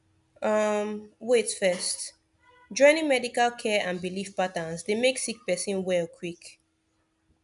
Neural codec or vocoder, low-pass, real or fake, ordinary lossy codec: none; 10.8 kHz; real; none